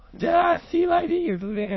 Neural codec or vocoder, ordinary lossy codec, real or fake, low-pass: autoencoder, 22.05 kHz, a latent of 192 numbers a frame, VITS, trained on many speakers; MP3, 24 kbps; fake; 7.2 kHz